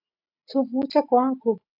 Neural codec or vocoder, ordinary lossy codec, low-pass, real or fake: none; AAC, 48 kbps; 5.4 kHz; real